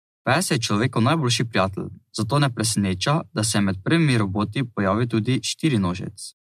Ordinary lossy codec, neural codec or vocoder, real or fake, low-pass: MP3, 64 kbps; none; real; 14.4 kHz